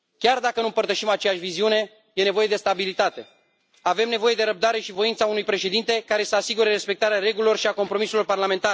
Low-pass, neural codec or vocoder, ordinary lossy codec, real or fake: none; none; none; real